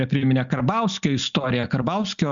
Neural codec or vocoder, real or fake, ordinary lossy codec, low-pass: none; real; Opus, 64 kbps; 7.2 kHz